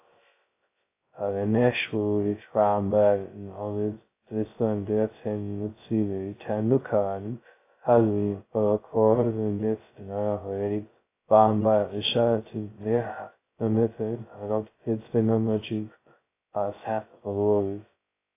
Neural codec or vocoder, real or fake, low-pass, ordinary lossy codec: codec, 16 kHz, 0.2 kbps, FocalCodec; fake; 3.6 kHz; AAC, 24 kbps